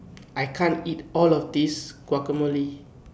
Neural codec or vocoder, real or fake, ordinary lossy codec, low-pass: none; real; none; none